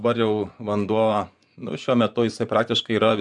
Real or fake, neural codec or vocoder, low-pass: fake; vocoder, 24 kHz, 100 mel bands, Vocos; 10.8 kHz